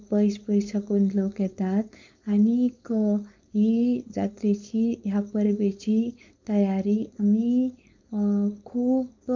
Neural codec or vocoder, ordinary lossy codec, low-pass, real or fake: codec, 16 kHz, 4.8 kbps, FACodec; MP3, 64 kbps; 7.2 kHz; fake